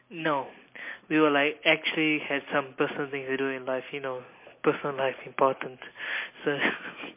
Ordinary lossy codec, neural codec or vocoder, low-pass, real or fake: MP3, 24 kbps; none; 3.6 kHz; real